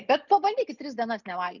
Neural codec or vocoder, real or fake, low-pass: none; real; 7.2 kHz